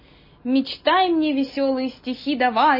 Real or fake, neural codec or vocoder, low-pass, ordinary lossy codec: real; none; 5.4 kHz; MP3, 24 kbps